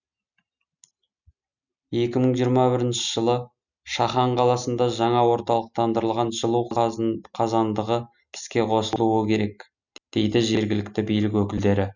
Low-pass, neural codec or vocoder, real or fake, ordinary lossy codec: 7.2 kHz; none; real; none